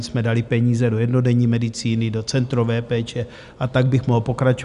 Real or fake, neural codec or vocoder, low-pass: real; none; 10.8 kHz